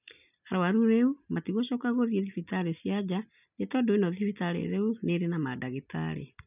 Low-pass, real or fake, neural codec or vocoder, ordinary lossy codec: 3.6 kHz; real; none; none